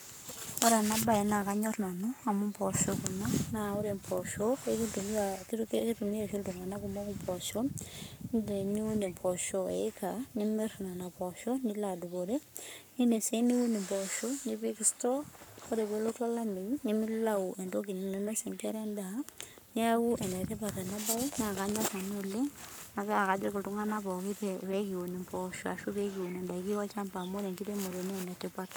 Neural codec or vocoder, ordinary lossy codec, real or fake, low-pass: codec, 44.1 kHz, 7.8 kbps, Pupu-Codec; none; fake; none